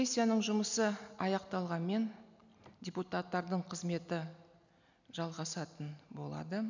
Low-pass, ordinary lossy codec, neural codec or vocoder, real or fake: 7.2 kHz; none; none; real